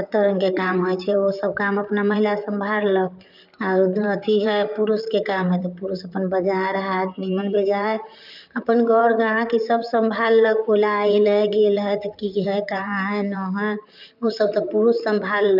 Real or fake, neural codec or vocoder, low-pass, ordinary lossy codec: fake; vocoder, 44.1 kHz, 128 mel bands, Pupu-Vocoder; 5.4 kHz; none